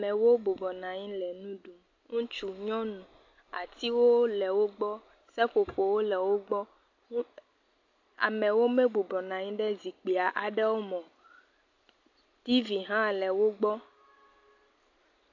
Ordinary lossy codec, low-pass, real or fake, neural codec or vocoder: AAC, 48 kbps; 7.2 kHz; real; none